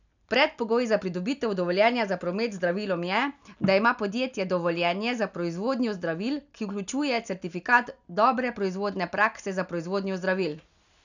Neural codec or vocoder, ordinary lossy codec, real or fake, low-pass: none; none; real; 7.2 kHz